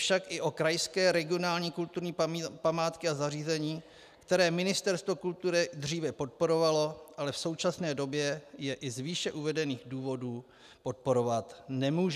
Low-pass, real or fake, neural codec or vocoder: 14.4 kHz; real; none